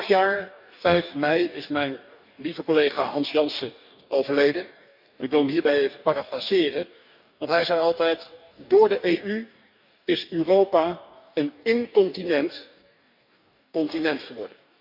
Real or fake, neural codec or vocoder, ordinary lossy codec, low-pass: fake; codec, 44.1 kHz, 2.6 kbps, DAC; none; 5.4 kHz